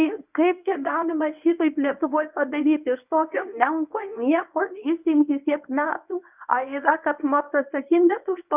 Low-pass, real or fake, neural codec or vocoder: 3.6 kHz; fake; codec, 24 kHz, 0.9 kbps, WavTokenizer, medium speech release version 1